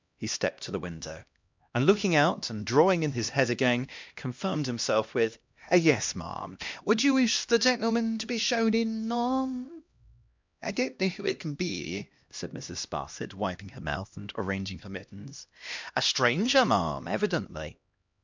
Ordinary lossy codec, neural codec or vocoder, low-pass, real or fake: MP3, 64 kbps; codec, 16 kHz, 1 kbps, X-Codec, HuBERT features, trained on LibriSpeech; 7.2 kHz; fake